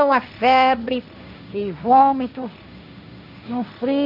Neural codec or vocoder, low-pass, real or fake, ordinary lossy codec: codec, 16 kHz, 1.1 kbps, Voila-Tokenizer; 5.4 kHz; fake; none